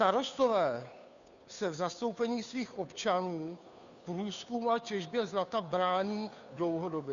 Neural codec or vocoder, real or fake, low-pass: codec, 16 kHz, 2 kbps, FunCodec, trained on Chinese and English, 25 frames a second; fake; 7.2 kHz